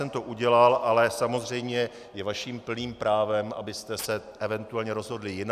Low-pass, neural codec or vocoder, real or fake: 14.4 kHz; none; real